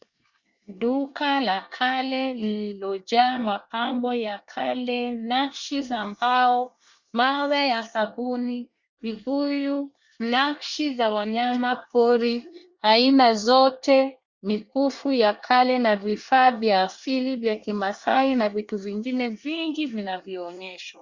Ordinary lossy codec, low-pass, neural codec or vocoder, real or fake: Opus, 64 kbps; 7.2 kHz; codec, 24 kHz, 1 kbps, SNAC; fake